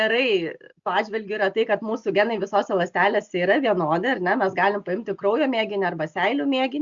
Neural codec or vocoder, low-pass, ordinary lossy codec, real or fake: none; 7.2 kHz; Opus, 64 kbps; real